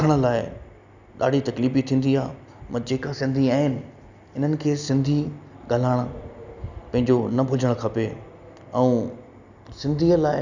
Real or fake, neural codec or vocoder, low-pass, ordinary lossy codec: real; none; 7.2 kHz; none